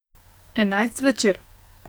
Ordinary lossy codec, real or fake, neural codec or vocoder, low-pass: none; fake; codec, 44.1 kHz, 2.6 kbps, SNAC; none